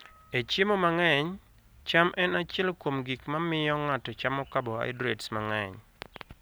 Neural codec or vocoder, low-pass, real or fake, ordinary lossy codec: none; none; real; none